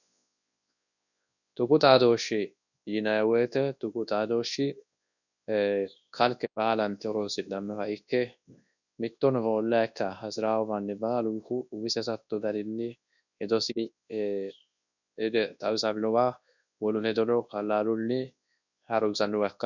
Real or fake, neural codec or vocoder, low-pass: fake; codec, 24 kHz, 0.9 kbps, WavTokenizer, large speech release; 7.2 kHz